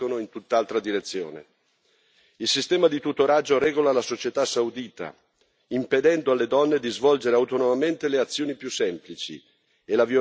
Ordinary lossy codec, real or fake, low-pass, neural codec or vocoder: none; real; none; none